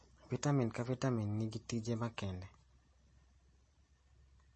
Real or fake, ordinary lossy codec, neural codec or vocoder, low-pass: real; MP3, 32 kbps; none; 10.8 kHz